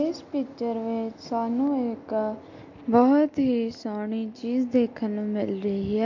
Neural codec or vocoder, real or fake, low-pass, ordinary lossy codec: none; real; 7.2 kHz; MP3, 48 kbps